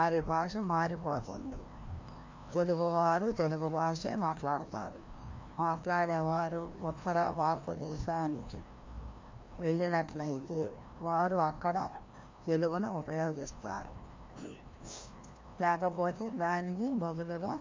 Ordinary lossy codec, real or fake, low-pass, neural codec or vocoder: MP3, 48 kbps; fake; 7.2 kHz; codec, 16 kHz, 1 kbps, FreqCodec, larger model